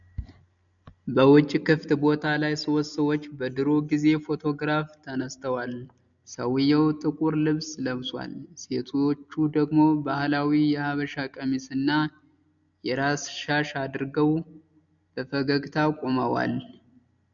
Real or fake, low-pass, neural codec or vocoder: real; 7.2 kHz; none